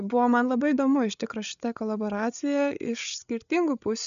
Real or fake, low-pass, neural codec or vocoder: fake; 7.2 kHz; codec, 16 kHz, 8 kbps, FreqCodec, larger model